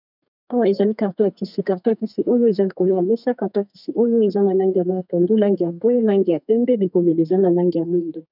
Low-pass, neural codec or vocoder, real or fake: 5.4 kHz; codec, 32 kHz, 1.9 kbps, SNAC; fake